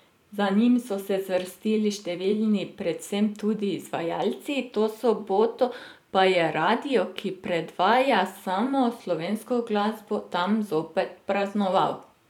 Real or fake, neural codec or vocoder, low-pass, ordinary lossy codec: fake; vocoder, 44.1 kHz, 128 mel bands every 512 samples, BigVGAN v2; 19.8 kHz; none